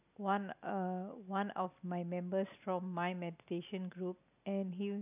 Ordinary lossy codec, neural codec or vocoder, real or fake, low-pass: none; none; real; 3.6 kHz